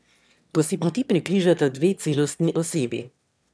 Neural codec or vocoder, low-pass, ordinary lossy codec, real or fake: autoencoder, 22.05 kHz, a latent of 192 numbers a frame, VITS, trained on one speaker; none; none; fake